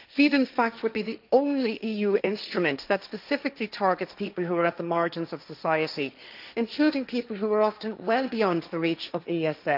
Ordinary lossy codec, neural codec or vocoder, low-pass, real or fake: none; codec, 16 kHz, 1.1 kbps, Voila-Tokenizer; 5.4 kHz; fake